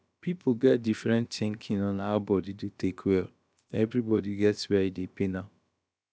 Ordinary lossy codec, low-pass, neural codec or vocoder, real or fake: none; none; codec, 16 kHz, about 1 kbps, DyCAST, with the encoder's durations; fake